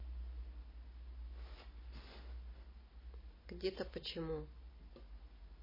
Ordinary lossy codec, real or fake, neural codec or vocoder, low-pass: MP3, 24 kbps; real; none; 5.4 kHz